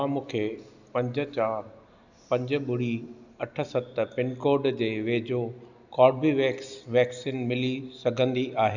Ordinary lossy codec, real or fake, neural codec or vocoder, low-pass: none; real; none; 7.2 kHz